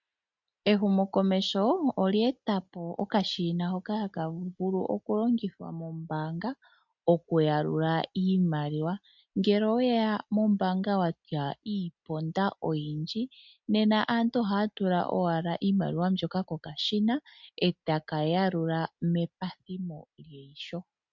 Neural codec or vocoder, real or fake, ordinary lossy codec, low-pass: none; real; MP3, 64 kbps; 7.2 kHz